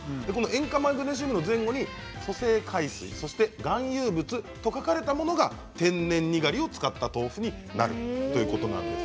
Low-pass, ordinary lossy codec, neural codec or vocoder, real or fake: none; none; none; real